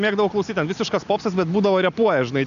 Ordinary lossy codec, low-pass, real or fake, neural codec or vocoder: MP3, 96 kbps; 7.2 kHz; real; none